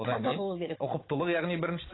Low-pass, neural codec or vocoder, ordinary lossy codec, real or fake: 7.2 kHz; codec, 16 kHz, 16 kbps, FunCodec, trained on Chinese and English, 50 frames a second; AAC, 16 kbps; fake